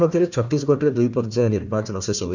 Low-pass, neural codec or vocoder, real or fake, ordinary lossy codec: 7.2 kHz; codec, 16 kHz, 1 kbps, FunCodec, trained on Chinese and English, 50 frames a second; fake; none